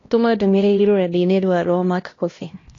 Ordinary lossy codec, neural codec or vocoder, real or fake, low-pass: AAC, 32 kbps; codec, 16 kHz, 1 kbps, X-Codec, HuBERT features, trained on LibriSpeech; fake; 7.2 kHz